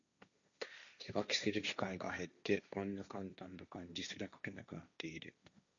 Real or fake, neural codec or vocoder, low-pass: fake; codec, 16 kHz, 1.1 kbps, Voila-Tokenizer; 7.2 kHz